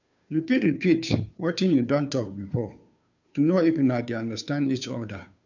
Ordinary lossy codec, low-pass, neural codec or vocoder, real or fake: none; 7.2 kHz; codec, 16 kHz, 2 kbps, FunCodec, trained on Chinese and English, 25 frames a second; fake